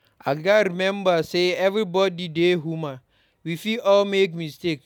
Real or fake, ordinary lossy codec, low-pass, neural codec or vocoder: real; none; 19.8 kHz; none